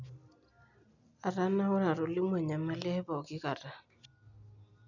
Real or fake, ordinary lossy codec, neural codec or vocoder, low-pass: real; none; none; 7.2 kHz